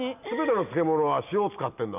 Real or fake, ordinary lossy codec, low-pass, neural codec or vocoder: real; none; 3.6 kHz; none